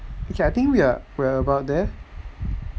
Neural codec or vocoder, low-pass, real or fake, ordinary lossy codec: none; none; real; none